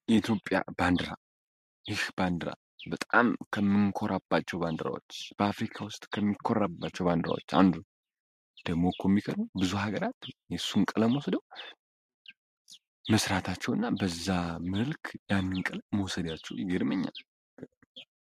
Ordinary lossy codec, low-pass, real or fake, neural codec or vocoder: MP3, 64 kbps; 14.4 kHz; real; none